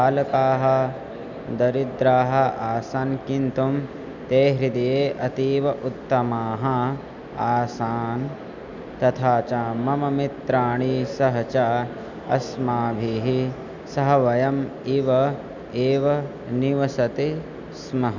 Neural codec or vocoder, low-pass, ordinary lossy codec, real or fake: none; 7.2 kHz; none; real